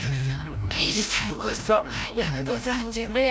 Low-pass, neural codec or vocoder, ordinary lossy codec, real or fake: none; codec, 16 kHz, 0.5 kbps, FreqCodec, larger model; none; fake